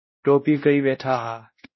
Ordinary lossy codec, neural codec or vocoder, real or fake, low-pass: MP3, 24 kbps; codec, 16 kHz, 1 kbps, X-Codec, WavLM features, trained on Multilingual LibriSpeech; fake; 7.2 kHz